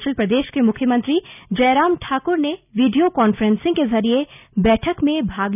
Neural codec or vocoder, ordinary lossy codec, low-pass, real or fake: none; none; 3.6 kHz; real